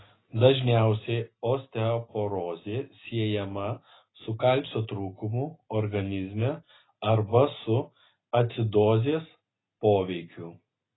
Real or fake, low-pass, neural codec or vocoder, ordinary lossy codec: real; 7.2 kHz; none; AAC, 16 kbps